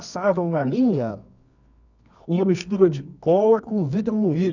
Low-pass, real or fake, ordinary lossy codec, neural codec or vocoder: 7.2 kHz; fake; none; codec, 24 kHz, 0.9 kbps, WavTokenizer, medium music audio release